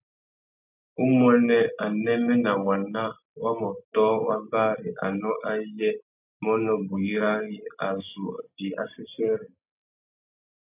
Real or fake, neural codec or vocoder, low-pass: real; none; 3.6 kHz